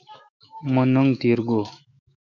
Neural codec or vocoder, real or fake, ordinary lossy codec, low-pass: autoencoder, 48 kHz, 128 numbers a frame, DAC-VAE, trained on Japanese speech; fake; MP3, 64 kbps; 7.2 kHz